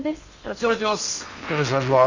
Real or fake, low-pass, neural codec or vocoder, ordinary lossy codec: fake; 7.2 kHz; codec, 16 kHz in and 24 kHz out, 0.8 kbps, FocalCodec, streaming, 65536 codes; Opus, 64 kbps